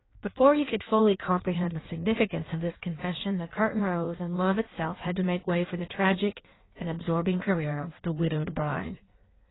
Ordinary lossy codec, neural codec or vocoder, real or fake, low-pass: AAC, 16 kbps; codec, 16 kHz in and 24 kHz out, 1.1 kbps, FireRedTTS-2 codec; fake; 7.2 kHz